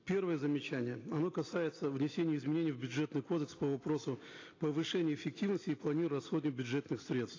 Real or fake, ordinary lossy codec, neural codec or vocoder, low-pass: real; AAC, 32 kbps; none; 7.2 kHz